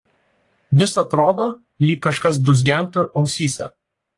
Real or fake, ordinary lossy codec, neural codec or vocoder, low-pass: fake; AAC, 48 kbps; codec, 44.1 kHz, 1.7 kbps, Pupu-Codec; 10.8 kHz